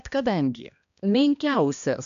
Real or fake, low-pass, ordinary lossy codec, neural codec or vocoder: fake; 7.2 kHz; AAC, 96 kbps; codec, 16 kHz, 1 kbps, X-Codec, HuBERT features, trained on balanced general audio